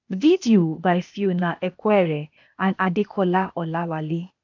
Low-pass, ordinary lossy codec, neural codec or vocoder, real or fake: 7.2 kHz; MP3, 64 kbps; codec, 16 kHz, 0.8 kbps, ZipCodec; fake